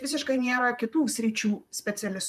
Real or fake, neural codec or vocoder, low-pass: fake; vocoder, 44.1 kHz, 128 mel bands, Pupu-Vocoder; 14.4 kHz